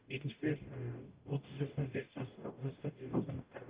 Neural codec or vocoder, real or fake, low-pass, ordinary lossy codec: codec, 44.1 kHz, 0.9 kbps, DAC; fake; 3.6 kHz; Opus, 32 kbps